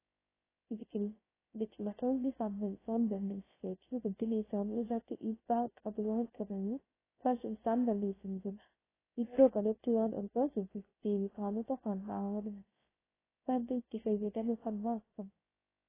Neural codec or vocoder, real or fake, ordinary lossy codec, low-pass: codec, 16 kHz, 0.3 kbps, FocalCodec; fake; AAC, 16 kbps; 3.6 kHz